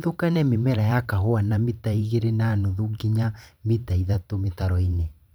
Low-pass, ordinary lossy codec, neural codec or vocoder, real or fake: none; none; vocoder, 44.1 kHz, 128 mel bands every 512 samples, BigVGAN v2; fake